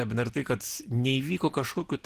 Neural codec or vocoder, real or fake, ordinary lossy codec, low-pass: none; real; Opus, 16 kbps; 14.4 kHz